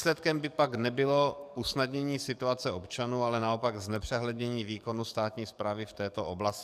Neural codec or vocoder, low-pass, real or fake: codec, 44.1 kHz, 7.8 kbps, DAC; 14.4 kHz; fake